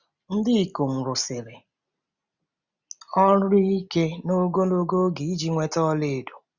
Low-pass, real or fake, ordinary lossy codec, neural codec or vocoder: 7.2 kHz; real; Opus, 64 kbps; none